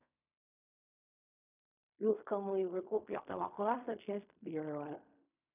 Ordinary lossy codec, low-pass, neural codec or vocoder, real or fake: none; 3.6 kHz; codec, 16 kHz in and 24 kHz out, 0.4 kbps, LongCat-Audio-Codec, fine tuned four codebook decoder; fake